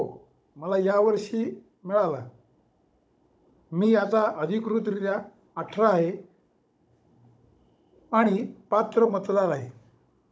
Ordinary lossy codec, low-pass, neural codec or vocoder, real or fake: none; none; codec, 16 kHz, 16 kbps, FunCodec, trained on Chinese and English, 50 frames a second; fake